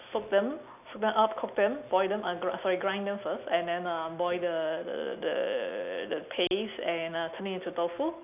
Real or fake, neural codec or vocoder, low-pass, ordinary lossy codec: real; none; 3.6 kHz; none